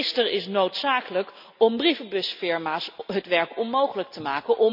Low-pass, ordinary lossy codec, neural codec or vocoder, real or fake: 5.4 kHz; none; none; real